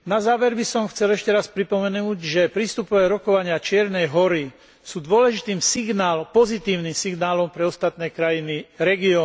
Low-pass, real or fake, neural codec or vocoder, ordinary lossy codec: none; real; none; none